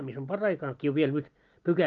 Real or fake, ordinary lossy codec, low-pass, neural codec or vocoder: real; Opus, 24 kbps; 7.2 kHz; none